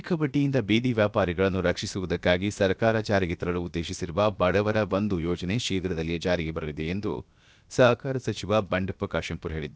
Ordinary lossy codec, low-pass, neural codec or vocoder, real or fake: none; none; codec, 16 kHz, about 1 kbps, DyCAST, with the encoder's durations; fake